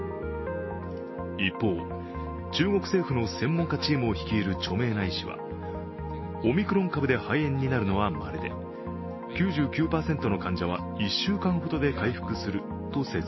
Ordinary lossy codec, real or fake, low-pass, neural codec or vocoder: MP3, 24 kbps; real; 7.2 kHz; none